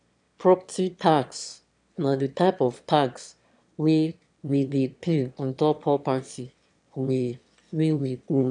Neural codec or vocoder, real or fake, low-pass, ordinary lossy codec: autoencoder, 22.05 kHz, a latent of 192 numbers a frame, VITS, trained on one speaker; fake; 9.9 kHz; none